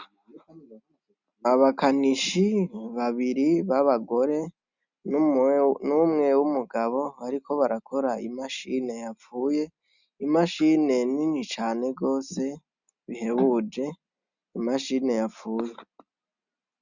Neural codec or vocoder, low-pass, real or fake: none; 7.2 kHz; real